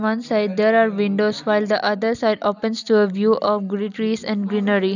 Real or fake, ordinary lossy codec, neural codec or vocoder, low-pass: real; none; none; 7.2 kHz